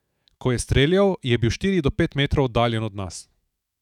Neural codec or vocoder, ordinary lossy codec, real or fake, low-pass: autoencoder, 48 kHz, 128 numbers a frame, DAC-VAE, trained on Japanese speech; none; fake; 19.8 kHz